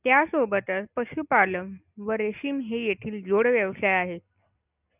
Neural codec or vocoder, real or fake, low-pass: none; real; 3.6 kHz